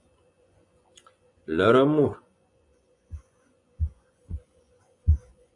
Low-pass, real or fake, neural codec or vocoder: 10.8 kHz; fake; vocoder, 24 kHz, 100 mel bands, Vocos